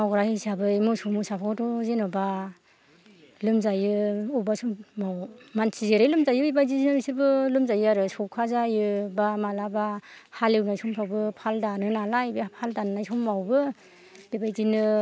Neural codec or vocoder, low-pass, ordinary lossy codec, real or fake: none; none; none; real